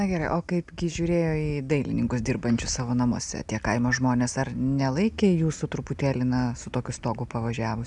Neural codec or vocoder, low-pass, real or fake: none; 10.8 kHz; real